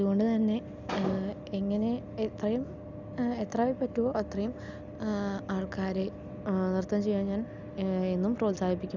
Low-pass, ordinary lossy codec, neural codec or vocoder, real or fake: 7.2 kHz; none; none; real